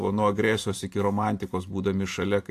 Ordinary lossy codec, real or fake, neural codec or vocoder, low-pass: AAC, 64 kbps; fake; vocoder, 44.1 kHz, 128 mel bands every 512 samples, BigVGAN v2; 14.4 kHz